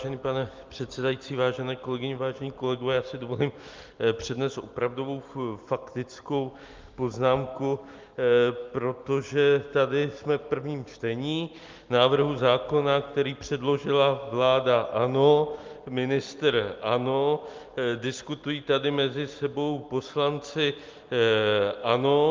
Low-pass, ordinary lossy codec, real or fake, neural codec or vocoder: 7.2 kHz; Opus, 32 kbps; real; none